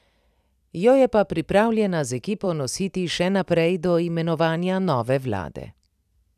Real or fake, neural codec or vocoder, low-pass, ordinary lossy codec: fake; vocoder, 44.1 kHz, 128 mel bands every 256 samples, BigVGAN v2; 14.4 kHz; none